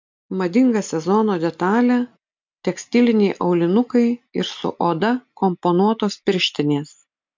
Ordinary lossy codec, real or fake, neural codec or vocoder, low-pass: MP3, 64 kbps; real; none; 7.2 kHz